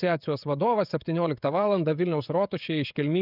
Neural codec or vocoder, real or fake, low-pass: codec, 16 kHz, 16 kbps, FreqCodec, smaller model; fake; 5.4 kHz